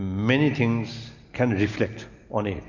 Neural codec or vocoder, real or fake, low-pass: none; real; 7.2 kHz